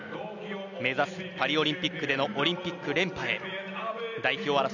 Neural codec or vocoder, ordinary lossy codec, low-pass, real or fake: none; none; 7.2 kHz; real